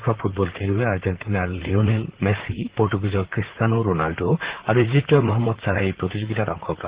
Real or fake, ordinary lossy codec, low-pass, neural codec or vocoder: fake; Opus, 16 kbps; 3.6 kHz; vocoder, 44.1 kHz, 128 mel bands, Pupu-Vocoder